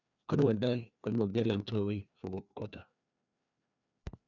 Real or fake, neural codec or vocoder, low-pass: fake; codec, 16 kHz, 2 kbps, FreqCodec, larger model; 7.2 kHz